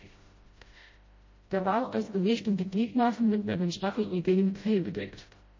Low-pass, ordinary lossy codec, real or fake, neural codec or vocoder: 7.2 kHz; MP3, 32 kbps; fake; codec, 16 kHz, 0.5 kbps, FreqCodec, smaller model